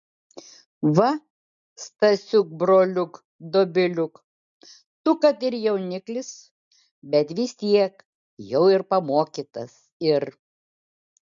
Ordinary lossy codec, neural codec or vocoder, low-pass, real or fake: MP3, 96 kbps; none; 7.2 kHz; real